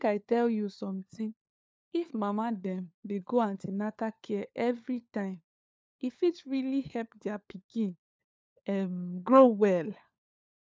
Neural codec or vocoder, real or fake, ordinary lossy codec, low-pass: codec, 16 kHz, 4 kbps, FunCodec, trained on LibriTTS, 50 frames a second; fake; none; none